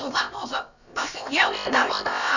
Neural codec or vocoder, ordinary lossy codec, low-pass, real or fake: codec, 16 kHz, about 1 kbps, DyCAST, with the encoder's durations; none; 7.2 kHz; fake